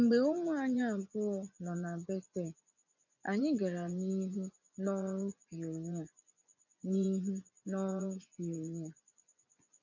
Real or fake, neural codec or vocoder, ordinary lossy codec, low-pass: fake; vocoder, 24 kHz, 100 mel bands, Vocos; none; 7.2 kHz